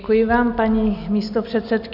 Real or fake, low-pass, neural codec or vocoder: real; 5.4 kHz; none